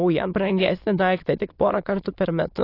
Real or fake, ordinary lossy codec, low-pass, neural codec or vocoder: fake; AAC, 32 kbps; 5.4 kHz; autoencoder, 22.05 kHz, a latent of 192 numbers a frame, VITS, trained on many speakers